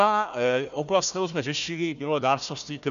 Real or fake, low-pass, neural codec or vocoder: fake; 7.2 kHz; codec, 16 kHz, 1 kbps, FunCodec, trained on Chinese and English, 50 frames a second